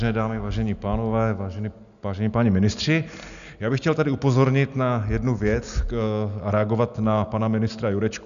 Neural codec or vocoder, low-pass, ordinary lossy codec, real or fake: none; 7.2 kHz; MP3, 96 kbps; real